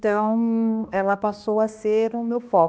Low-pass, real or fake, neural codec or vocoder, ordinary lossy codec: none; fake; codec, 16 kHz, 1 kbps, X-Codec, HuBERT features, trained on balanced general audio; none